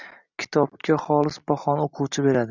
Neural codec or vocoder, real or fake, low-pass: none; real; 7.2 kHz